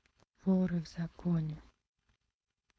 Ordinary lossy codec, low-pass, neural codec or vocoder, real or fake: none; none; codec, 16 kHz, 4.8 kbps, FACodec; fake